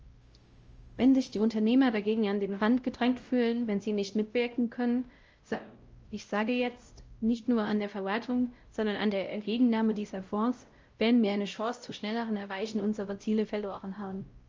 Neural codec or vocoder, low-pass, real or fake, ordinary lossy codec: codec, 16 kHz, 0.5 kbps, X-Codec, WavLM features, trained on Multilingual LibriSpeech; 7.2 kHz; fake; Opus, 24 kbps